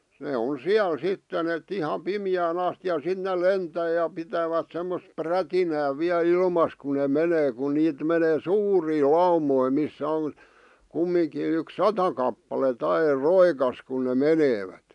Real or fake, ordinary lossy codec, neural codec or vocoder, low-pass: real; none; none; 10.8 kHz